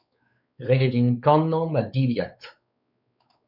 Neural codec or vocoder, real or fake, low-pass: codec, 16 kHz, 4 kbps, X-Codec, WavLM features, trained on Multilingual LibriSpeech; fake; 5.4 kHz